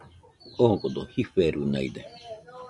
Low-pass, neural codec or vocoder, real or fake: 10.8 kHz; none; real